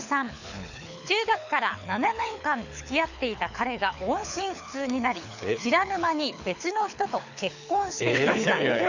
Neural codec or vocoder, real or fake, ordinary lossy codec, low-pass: codec, 24 kHz, 6 kbps, HILCodec; fake; none; 7.2 kHz